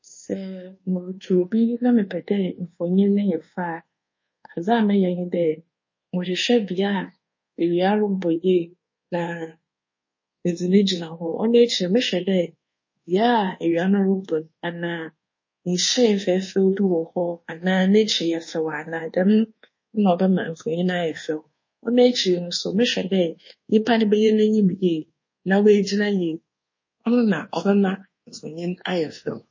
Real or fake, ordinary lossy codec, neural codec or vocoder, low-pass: fake; MP3, 32 kbps; codec, 24 kHz, 1.2 kbps, DualCodec; 7.2 kHz